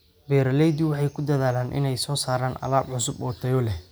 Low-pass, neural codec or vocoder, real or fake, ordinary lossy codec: none; none; real; none